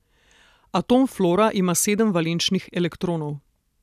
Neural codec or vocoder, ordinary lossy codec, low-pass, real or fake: none; none; 14.4 kHz; real